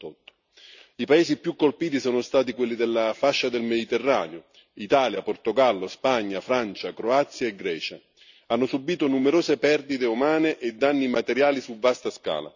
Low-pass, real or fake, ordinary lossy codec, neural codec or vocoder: 7.2 kHz; real; none; none